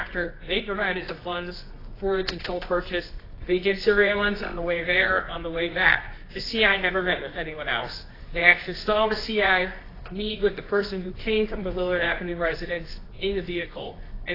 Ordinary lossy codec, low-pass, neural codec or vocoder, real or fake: AAC, 24 kbps; 5.4 kHz; codec, 24 kHz, 0.9 kbps, WavTokenizer, medium music audio release; fake